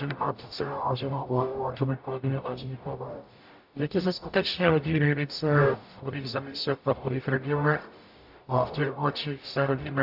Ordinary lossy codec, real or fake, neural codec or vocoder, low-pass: AAC, 48 kbps; fake; codec, 44.1 kHz, 0.9 kbps, DAC; 5.4 kHz